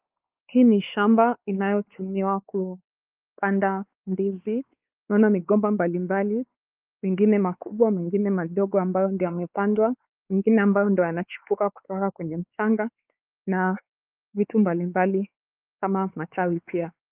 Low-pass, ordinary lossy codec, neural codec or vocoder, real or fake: 3.6 kHz; Opus, 24 kbps; codec, 16 kHz, 4 kbps, X-Codec, WavLM features, trained on Multilingual LibriSpeech; fake